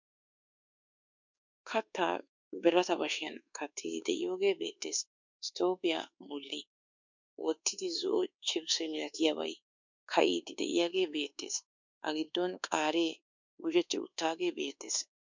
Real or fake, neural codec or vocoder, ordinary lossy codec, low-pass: fake; codec, 24 kHz, 1.2 kbps, DualCodec; MP3, 64 kbps; 7.2 kHz